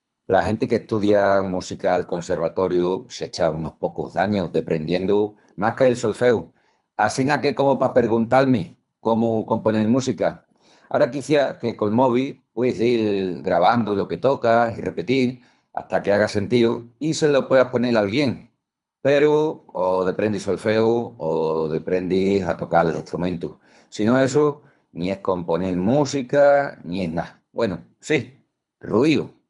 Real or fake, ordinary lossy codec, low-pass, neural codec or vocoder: fake; none; 10.8 kHz; codec, 24 kHz, 3 kbps, HILCodec